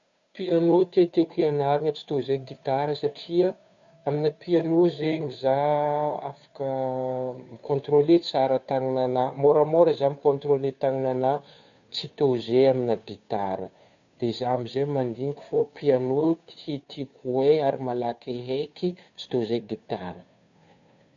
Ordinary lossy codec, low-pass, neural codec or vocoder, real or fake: none; 7.2 kHz; codec, 16 kHz, 2 kbps, FunCodec, trained on Chinese and English, 25 frames a second; fake